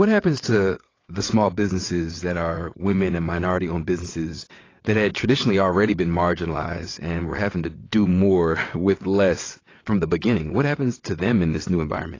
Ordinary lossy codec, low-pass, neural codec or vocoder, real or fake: AAC, 32 kbps; 7.2 kHz; vocoder, 22.05 kHz, 80 mel bands, WaveNeXt; fake